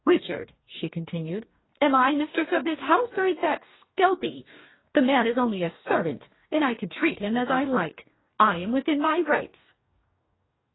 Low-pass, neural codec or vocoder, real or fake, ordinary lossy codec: 7.2 kHz; codec, 44.1 kHz, 2.6 kbps, DAC; fake; AAC, 16 kbps